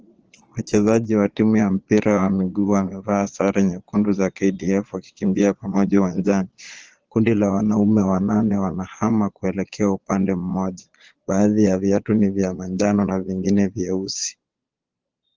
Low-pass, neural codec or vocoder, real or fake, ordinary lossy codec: 7.2 kHz; vocoder, 22.05 kHz, 80 mel bands, Vocos; fake; Opus, 16 kbps